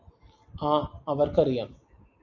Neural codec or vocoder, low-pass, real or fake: none; 7.2 kHz; real